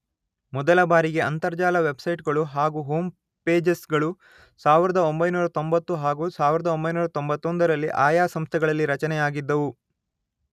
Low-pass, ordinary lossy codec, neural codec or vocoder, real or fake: 14.4 kHz; none; none; real